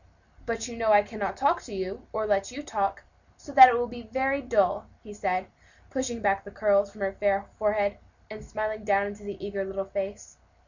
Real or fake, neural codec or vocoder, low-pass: real; none; 7.2 kHz